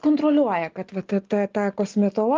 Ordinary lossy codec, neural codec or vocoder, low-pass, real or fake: Opus, 24 kbps; none; 7.2 kHz; real